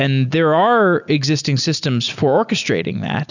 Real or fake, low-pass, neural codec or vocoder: real; 7.2 kHz; none